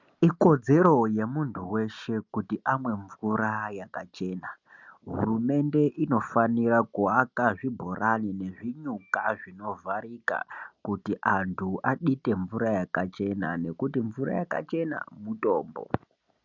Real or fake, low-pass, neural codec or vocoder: real; 7.2 kHz; none